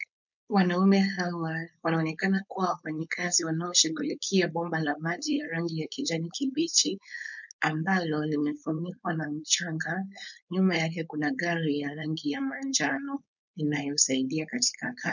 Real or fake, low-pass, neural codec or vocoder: fake; 7.2 kHz; codec, 16 kHz, 4.8 kbps, FACodec